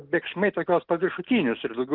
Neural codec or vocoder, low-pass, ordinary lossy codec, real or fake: none; 5.4 kHz; Opus, 24 kbps; real